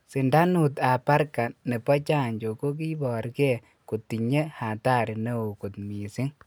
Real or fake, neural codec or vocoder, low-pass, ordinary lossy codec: real; none; none; none